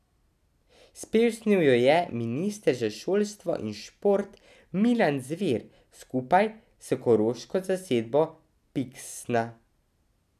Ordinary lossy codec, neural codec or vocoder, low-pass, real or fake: none; none; 14.4 kHz; real